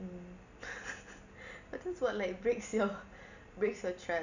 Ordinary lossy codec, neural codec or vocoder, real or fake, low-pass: none; none; real; 7.2 kHz